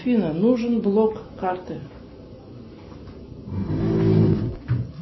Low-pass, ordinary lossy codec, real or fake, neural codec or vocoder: 7.2 kHz; MP3, 24 kbps; real; none